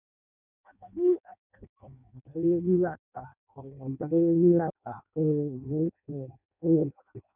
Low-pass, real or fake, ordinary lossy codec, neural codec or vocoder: 3.6 kHz; fake; none; codec, 16 kHz in and 24 kHz out, 0.6 kbps, FireRedTTS-2 codec